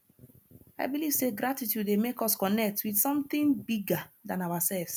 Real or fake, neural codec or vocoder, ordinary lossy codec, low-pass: real; none; none; none